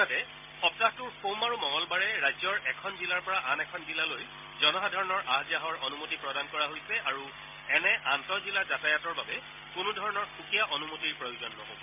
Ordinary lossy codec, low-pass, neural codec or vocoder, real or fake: MP3, 32 kbps; 3.6 kHz; none; real